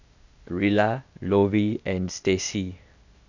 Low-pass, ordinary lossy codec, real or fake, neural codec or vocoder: 7.2 kHz; none; fake; codec, 16 kHz, 0.8 kbps, ZipCodec